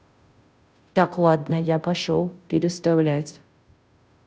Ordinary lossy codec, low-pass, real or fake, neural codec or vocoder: none; none; fake; codec, 16 kHz, 0.5 kbps, FunCodec, trained on Chinese and English, 25 frames a second